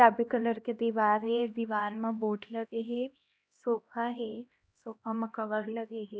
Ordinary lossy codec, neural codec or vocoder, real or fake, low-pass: none; codec, 16 kHz, 1 kbps, X-Codec, HuBERT features, trained on LibriSpeech; fake; none